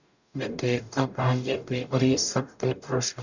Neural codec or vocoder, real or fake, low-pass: codec, 44.1 kHz, 0.9 kbps, DAC; fake; 7.2 kHz